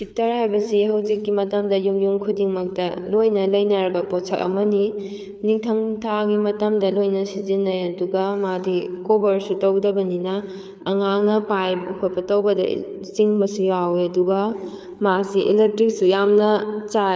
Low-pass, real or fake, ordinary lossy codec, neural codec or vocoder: none; fake; none; codec, 16 kHz, 4 kbps, FreqCodec, larger model